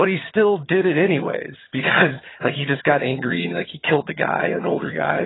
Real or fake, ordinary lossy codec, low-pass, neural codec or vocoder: fake; AAC, 16 kbps; 7.2 kHz; vocoder, 22.05 kHz, 80 mel bands, HiFi-GAN